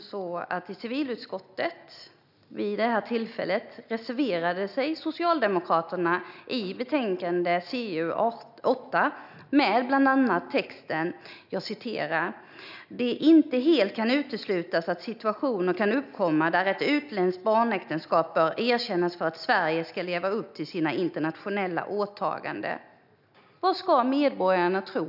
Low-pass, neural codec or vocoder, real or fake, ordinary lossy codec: 5.4 kHz; none; real; none